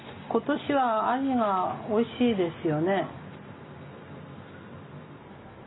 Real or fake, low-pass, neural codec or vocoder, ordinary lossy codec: real; 7.2 kHz; none; AAC, 16 kbps